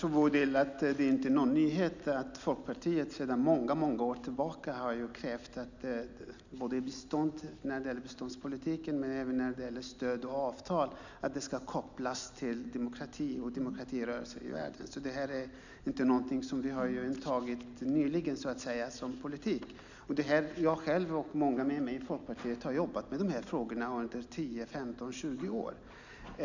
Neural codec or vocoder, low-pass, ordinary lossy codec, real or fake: none; 7.2 kHz; none; real